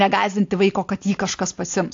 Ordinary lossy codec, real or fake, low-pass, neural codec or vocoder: AAC, 48 kbps; real; 7.2 kHz; none